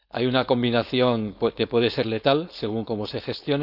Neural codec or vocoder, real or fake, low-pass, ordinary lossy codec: codec, 16 kHz, 4.8 kbps, FACodec; fake; 5.4 kHz; none